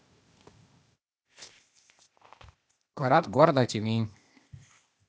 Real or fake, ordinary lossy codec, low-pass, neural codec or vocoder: fake; none; none; codec, 16 kHz, 0.8 kbps, ZipCodec